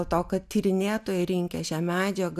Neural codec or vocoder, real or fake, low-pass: none; real; 14.4 kHz